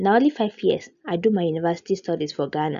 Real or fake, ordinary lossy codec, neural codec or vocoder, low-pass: real; none; none; 7.2 kHz